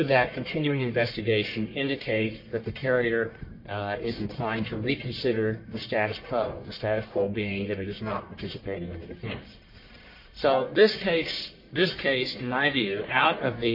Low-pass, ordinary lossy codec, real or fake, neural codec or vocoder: 5.4 kHz; MP3, 32 kbps; fake; codec, 44.1 kHz, 1.7 kbps, Pupu-Codec